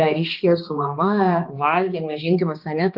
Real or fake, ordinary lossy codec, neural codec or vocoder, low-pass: fake; Opus, 32 kbps; codec, 16 kHz, 2 kbps, X-Codec, HuBERT features, trained on balanced general audio; 5.4 kHz